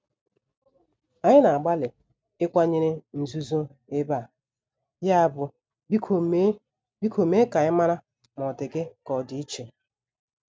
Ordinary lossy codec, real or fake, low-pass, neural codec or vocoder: none; real; none; none